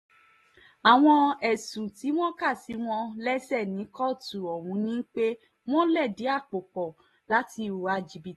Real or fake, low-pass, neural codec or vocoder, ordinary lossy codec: real; 19.8 kHz; none; AAC, 32 kbps